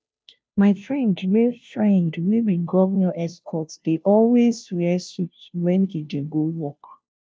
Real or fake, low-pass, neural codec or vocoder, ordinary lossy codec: fake; none; codec, 16 kHz, 0.5 kbps, FunCodec, trained on Chinese and English, 25 frames a second; none